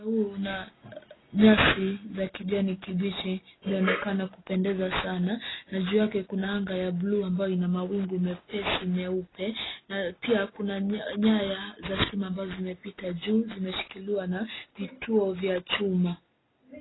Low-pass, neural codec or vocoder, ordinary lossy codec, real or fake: 7.2 kHz; none; AAC, 16 kbps; real